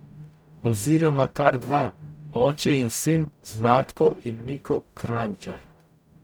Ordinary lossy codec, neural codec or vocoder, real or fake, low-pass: none; codec, 44.1 kHz, 0.9 kbps, DAC; fake; none